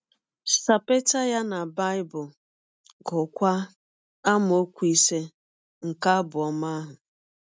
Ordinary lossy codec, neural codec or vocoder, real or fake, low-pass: none; none; real; none